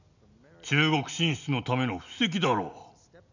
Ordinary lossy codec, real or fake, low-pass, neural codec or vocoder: none; real; 7.2 kHz; none